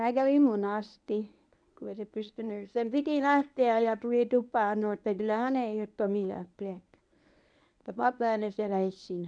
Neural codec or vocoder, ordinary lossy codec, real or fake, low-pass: codec, 24 kHz, 0.9 kbps, WavTokenizer, medium speech release version 1; none; fake; 9.9 kHz